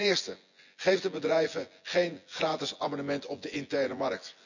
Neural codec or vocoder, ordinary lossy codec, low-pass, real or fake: vocoder, 24 kHz, 100 mel bands, Vocos; none; 7.2 kHz; fake